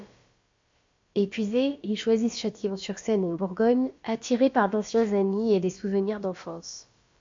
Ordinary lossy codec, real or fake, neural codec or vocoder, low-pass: MP3, 48 kbps; fake; codec, 16 kHz, about 1 kbps, DyCAST, with the encoder's durations; 7.2 kHz